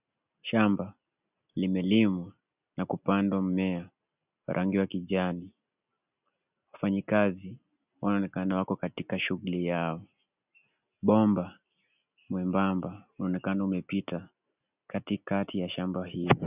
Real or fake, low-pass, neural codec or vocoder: real; 3.6 kHz; none